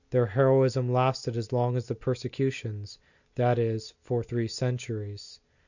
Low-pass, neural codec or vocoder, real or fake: 7.2 kHz; none; real